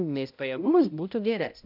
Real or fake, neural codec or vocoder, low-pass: fake; codec, 16 kHz, 0.5 kbps, X-Codec, HuBERT features, trained on balanced general audio; 5.4 kHz